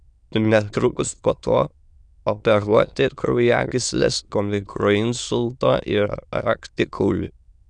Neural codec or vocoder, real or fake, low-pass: autoencoder, 22.05 kHz, a latent of 192 numbers a frame, VITS, trained on many speakers; fake; 9.9 kHz